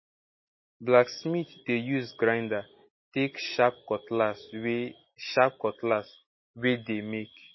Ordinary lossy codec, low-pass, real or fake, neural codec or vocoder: MP3, 24 kbps; 7.2 kHz; real; none